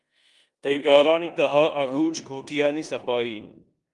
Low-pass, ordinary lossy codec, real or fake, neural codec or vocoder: 10.8 kHz; Opus, 32 kbps; fake; codec, 16 kHz in and 24 kHz out, 0.9 kbps, LongCat-Audio-Codec, four codebook decoder